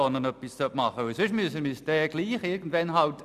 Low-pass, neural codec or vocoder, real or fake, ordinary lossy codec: 14.4 kHz; none; real; none